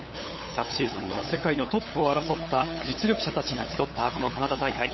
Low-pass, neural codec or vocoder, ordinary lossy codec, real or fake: 7.2 kHz; codec, 16 kHz, 8 kbps, FunCodec, trained on LibriTTS, 25 frames a second; MP3, 24 kbps; fake